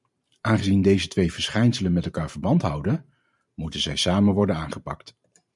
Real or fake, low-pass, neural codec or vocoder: fake; 10.8 kHz; vocoder, 44.1 kHz, 128 mel bands every 512 samples, BigVGAN v2